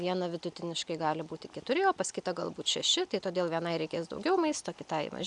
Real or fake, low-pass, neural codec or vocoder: real; 10.8 kHz; none